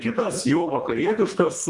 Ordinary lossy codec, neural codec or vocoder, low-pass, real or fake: Opus, 64 kbps; codec, 24 kHz, 1.5 kbps, HILCodec; 10.8 kHz; fake